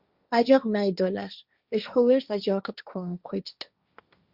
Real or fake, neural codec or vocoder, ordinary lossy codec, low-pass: fake; codec, 16 kHz, 1.1 kbps, Voila-Tokenizer; Opus, 64 kbps; 5.4 kHz